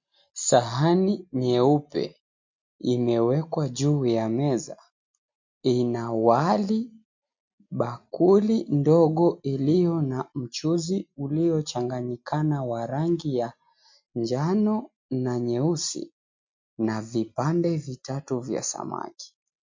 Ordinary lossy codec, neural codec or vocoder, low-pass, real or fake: MP3, 48 kbps; none; 7.2 kHz; real